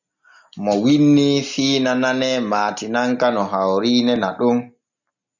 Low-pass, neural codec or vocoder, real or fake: 7.2 kHz; none; real